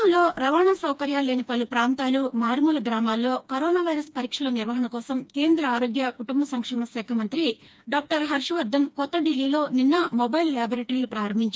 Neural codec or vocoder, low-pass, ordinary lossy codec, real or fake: codec, 16 kHz, 2 kbps, FreqCodec, smaller model; none; none; fake